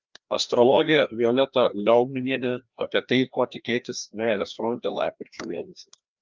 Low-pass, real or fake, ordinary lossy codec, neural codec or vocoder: 7.2 kHz; fake; Opus, 24 kbps; codec, 16 kHz, 1 kbps, FreqCodec, larger model